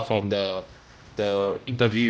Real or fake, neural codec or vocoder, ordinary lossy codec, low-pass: fake; codec, 16 kHz, 0.5 kbps, X-Codec, HuBERT features, trained on general audio; none; none